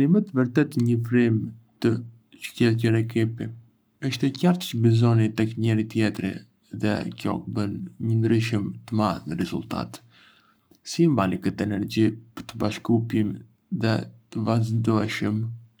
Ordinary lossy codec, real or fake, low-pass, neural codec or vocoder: none; fake; none; codec, 44.1 kHz, 7.8 kbps, Pupu-Codec